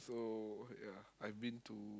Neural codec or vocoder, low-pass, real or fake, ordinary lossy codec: none; none; real; none